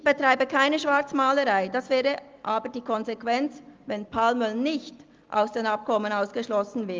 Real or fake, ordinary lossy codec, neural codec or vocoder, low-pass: real; Opus, 32 kbps; none; 7.2 kHz